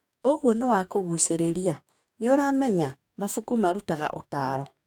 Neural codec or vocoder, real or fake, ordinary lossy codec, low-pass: codec, 44.1 kHz, 2.6 kbps, DAC; fake; none; 19.8 kHz